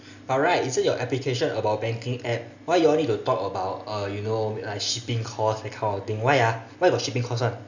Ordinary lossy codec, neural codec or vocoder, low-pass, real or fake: none; none; 7.2 kHz; real